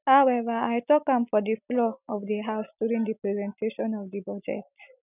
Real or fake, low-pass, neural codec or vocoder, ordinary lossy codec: real; 3.6 kHz; none; none